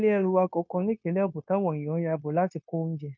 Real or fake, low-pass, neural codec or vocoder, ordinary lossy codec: fake; 7.2 kHz; codec, 16 kHz, 0.9 kbps, LongCat-Audio-Codec; none